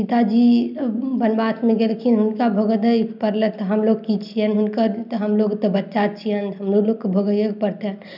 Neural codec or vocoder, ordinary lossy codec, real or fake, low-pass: none; none; real; 5.4 kHz